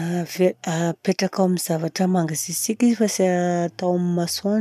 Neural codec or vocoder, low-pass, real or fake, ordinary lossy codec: none; 14.4 kHz; real; none